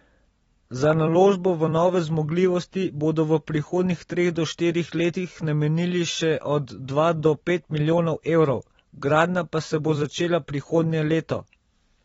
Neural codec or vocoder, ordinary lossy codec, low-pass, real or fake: vocoder, 44.1 kHz, 128 mel bands every 256 samples, BigVGAN v2; AAC, 24 kbps; 19.8 kHz; fake